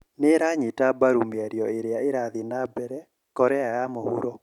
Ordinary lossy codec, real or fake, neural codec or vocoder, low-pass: none; real; none; 19.8 kHz